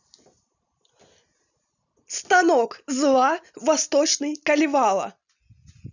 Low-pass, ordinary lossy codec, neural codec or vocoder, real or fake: 7.2 kHz; none; none; real